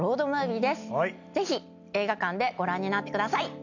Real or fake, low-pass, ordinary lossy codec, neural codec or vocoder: real; 7.2 kHz; none; none